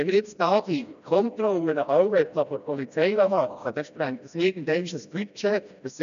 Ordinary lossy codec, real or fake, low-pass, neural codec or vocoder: none; fake; 7.2 kHz; codec, 16 kHz, 1 kbps, FreqCodec, smaller model